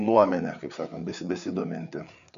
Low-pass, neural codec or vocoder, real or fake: 7.2 kHz; codec, 16 kHz, 4 kbps, FreqCodec, larger model; fake